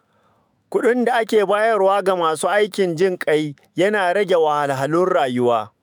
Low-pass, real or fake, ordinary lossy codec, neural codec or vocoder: none; fake; none; autoencoder, 48 kHz, 128 numbers a frame, DAC-VAE, trained on Japanese speech